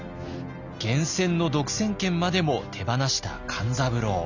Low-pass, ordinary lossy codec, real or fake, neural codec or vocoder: 7.2 kHz; none; real; none